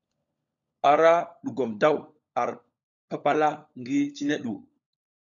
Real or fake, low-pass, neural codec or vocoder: fake; 7.2 kHz; codec, 16 kHz, 16 kbps, FunCodec, trained on LibriTTS, 50 frames a second